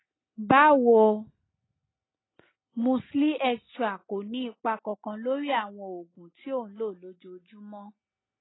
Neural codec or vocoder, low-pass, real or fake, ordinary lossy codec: none; 7.2 kHz; real; AAC, 16 kbps